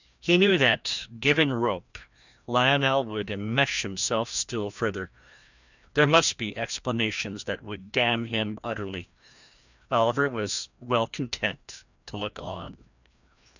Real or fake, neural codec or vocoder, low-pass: fake; codec, 16 kHz, 1 kbps, FreqCodec, larger model; 7.2 kHz